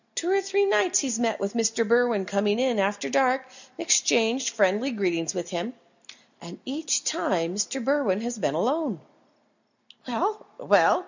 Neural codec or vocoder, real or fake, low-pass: none; real; 7.2 kHz